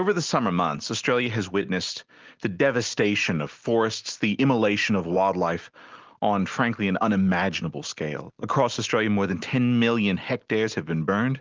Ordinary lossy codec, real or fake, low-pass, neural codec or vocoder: Opus, 32 kbps; real; 7.2 kHz; none